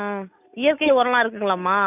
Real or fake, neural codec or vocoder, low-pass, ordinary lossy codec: real; none; 3.6 kHz; none